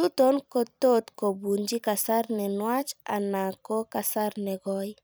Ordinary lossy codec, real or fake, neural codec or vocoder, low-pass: none; fake; vocoder, 44.1 kHz, 128 mel bands every 256 samples, BigVGAN v2; none